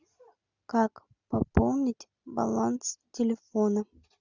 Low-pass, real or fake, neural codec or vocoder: 7.2 kHz; real; none